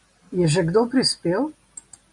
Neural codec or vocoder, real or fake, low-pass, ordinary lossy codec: none; real; 10.8 kHz; MP3, 96 kbps